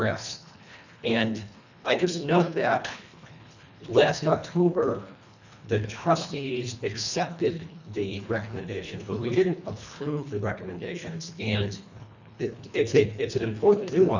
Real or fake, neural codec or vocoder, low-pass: fake; codec, 24 kHz, 1.5 kbps, HILCodec; 7.2 kHz